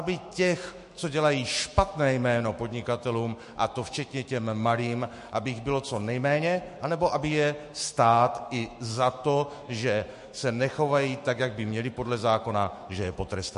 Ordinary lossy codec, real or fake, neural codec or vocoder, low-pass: MP3, 48 kbps; fake; autoencoder, 48 kHz, 128 numbers a frame, DAC-VAE, trained on Japanese speech; 14.4 kHz